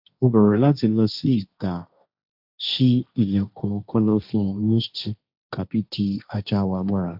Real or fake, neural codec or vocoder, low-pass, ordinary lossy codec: fake; codec, 16 kHz, 1.1 kbps, Voila-Tokenizer; 5.4 kHz; AAC, 48 kbps